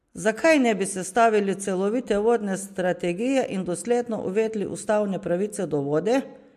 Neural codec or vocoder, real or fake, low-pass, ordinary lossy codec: none; real; 14.4 kHz; MP3, 64 kbps